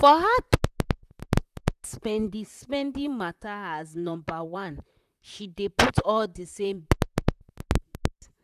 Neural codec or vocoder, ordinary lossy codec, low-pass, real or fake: vocoder, 44.1 kHz, 128 mel bands, Pupu-Vocoder; none; 14.4 kHz; fake